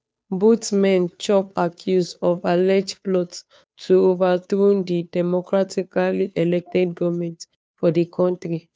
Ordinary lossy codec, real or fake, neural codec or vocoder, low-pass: none; fake; codec, 16 kHz, 2 kbps, FunCodec, trained on Chinese and English, 25 frames a second; none